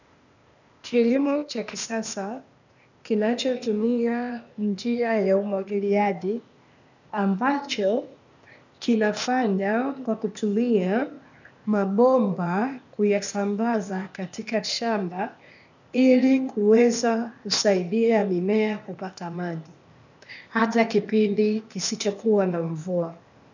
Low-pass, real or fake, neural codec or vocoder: 7.2 kHz; fake; codec, 16 kHz, 0.8 kbps, ZipCodec